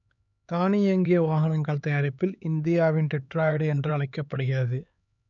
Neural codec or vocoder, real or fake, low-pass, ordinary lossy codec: codec, 16 kHz, 4 kbps, X-Codec, HuBERT features, trained on LibriSpeech; fake; 7.2 kHz; none